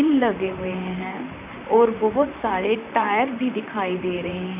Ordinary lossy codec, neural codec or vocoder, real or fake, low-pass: none; vocoder, 44.1 kHz, 128 mel bands, Pupu-Vocoder; fake; 3.6 kHz